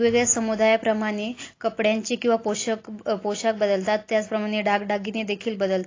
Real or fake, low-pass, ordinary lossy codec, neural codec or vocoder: real; 7.2 kHz; AAC, 32 kbps; none